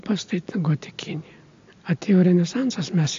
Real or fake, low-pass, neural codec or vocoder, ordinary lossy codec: real; 7.2 kHz; none; AAC, 48 kbps